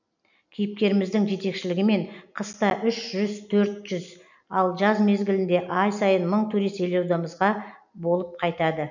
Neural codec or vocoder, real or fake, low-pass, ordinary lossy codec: none; real; 7.2 kHz; none